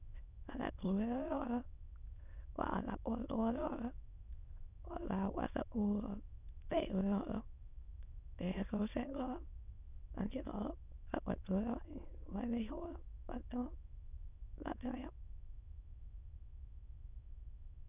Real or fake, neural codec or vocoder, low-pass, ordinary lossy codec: fake; autoencoder, 22.05 kHz, a latent of 192 numbers a frame, VITS, trained on many speakers; 3.6 kHz; none